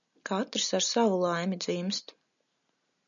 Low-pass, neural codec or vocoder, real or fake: 7.2 kHz; none; real